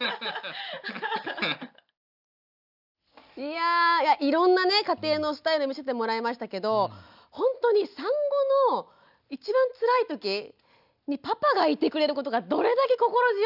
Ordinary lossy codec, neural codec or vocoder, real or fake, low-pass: none; none; real; 5.4 kHz